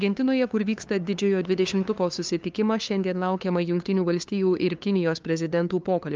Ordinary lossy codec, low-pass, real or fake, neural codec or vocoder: Opus, 24 kbps; 7.2 kHz; fake; codec, 16 kHz, 2 kbps, FunCodec, trained on LibriTTS, 25 frames a second